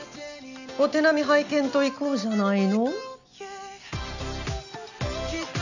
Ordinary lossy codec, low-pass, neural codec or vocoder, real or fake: none; 7.2 kHz; none; real